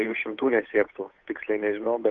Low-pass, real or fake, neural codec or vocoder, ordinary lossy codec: 7.2 kHz; fake; codec, 16 kHz, 8 kbps, FunCodec, trained on Chinese and English, 25 frames a second; Opus, 24 kbps